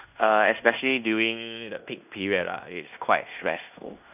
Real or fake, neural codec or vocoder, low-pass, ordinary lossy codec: fake; codec, 16 kHz in and 24 kHz out, 0.9 kbps, LongCat-Audio-Codec, fine tuned four codebook decoder; 3.6 kHz; none